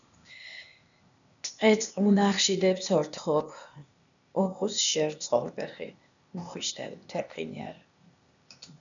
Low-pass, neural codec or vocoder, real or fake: 7.2 kHz; codec, 16 kHz, 0.8 kbps, ZipCodec; fake